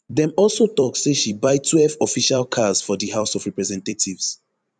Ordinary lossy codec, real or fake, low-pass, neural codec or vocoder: none; fake; 9.9 kHz; vocoder, 44.1 kHz, 128 mel bands every 256 samples, BigVGAN v2